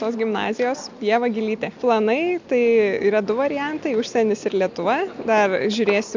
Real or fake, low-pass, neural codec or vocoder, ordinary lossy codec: real; 7.2 kHz; none; MP3, 64 kbps